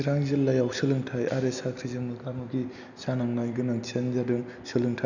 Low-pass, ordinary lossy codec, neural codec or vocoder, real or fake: 7.2 kHz; none; none; real